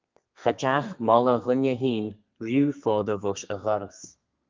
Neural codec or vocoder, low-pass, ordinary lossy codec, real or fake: codec, 32 kHz, 1.9 kbps, SNAC; 7.2 kHz; Opus, 32 kbps; fake